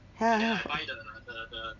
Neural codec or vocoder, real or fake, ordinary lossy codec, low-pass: none; real; none; 7.2 kHz